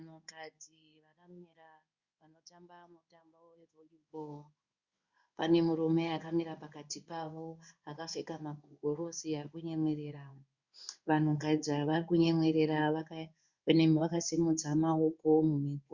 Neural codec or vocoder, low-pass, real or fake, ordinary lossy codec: codec, 16 kHz in and 24 kHz out, 1 kbps, XY-Tokenizer; 7.2 kHz; fake; Opus, 64 kbps